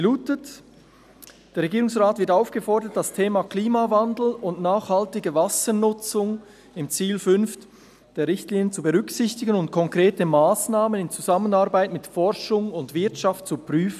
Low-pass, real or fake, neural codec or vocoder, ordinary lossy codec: 14.4 kHz; real; none; none